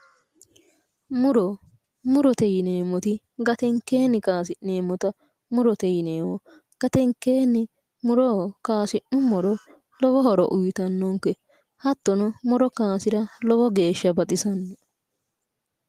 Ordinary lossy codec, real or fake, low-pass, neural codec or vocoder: Opus, 24 kbps; real; 14.4 kHz; none